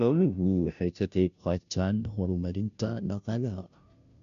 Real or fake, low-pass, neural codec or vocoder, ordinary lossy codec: fake; 7.2 kHz; codec, 16 kHz, 0.5 kbps, FunCodec, trained on Chinese and English, 25 frames a second; none